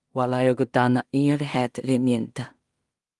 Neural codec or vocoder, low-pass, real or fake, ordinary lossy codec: codec, 16 kHz in and 24 kHz out, 0.4 kbps, LongCat-Audio-Codec, two codebook decoder; 10.8 kHz; fake; Opus, 24 kbps